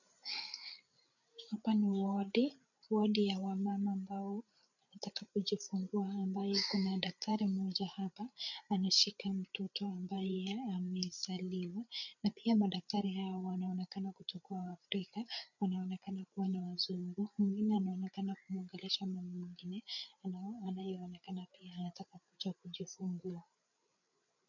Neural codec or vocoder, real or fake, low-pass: codec, 16 kHz, 8 kbps, FreqCodec, larger model; fake; 7.2 kHz